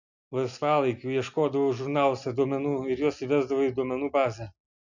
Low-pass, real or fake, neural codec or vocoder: 7.2 kHz; real; none